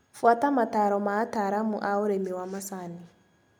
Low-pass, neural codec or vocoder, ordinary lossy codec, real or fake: none; none; none; real